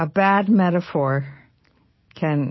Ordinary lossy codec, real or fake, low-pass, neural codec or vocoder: MP3, 24 kbps; real; 7.2 kHz; none